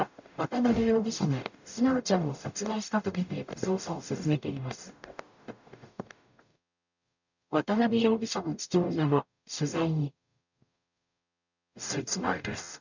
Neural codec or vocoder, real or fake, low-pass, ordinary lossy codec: codec, 44.1 kHz, 0.9 kbps, DAC; fake; 7.2 kHz; none